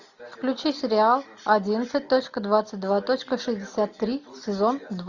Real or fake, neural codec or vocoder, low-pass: real; none; 7.2 kHz